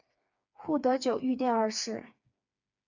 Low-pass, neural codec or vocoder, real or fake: 7.2 kHz; codec, 16 kHz, 4 kbps, FreqCodec, smaller model; fake